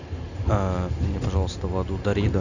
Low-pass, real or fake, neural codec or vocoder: 7.2 kHz; real; none